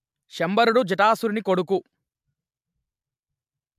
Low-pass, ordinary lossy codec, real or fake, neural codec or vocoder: 14.4 kHz; MP3, 96 kbps; real; none